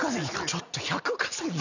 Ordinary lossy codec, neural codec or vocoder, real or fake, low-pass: none; none; real; 7.2 kHz